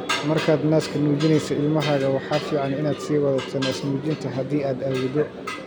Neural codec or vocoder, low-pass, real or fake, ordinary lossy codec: none; none; real; none